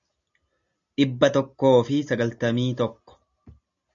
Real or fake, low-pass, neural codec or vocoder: real; 7.2 kHz; none